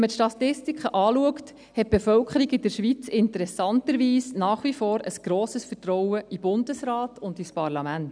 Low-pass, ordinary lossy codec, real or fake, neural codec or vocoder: 9.9 kHz; none; real; none